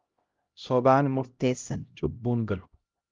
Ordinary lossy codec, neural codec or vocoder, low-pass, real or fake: Opus, 32 kbps; codec, 16 kHz, 0.5 kbps, X-Codec, HuBERT features, trained on LibriSpeech; 7.2 kHz; fake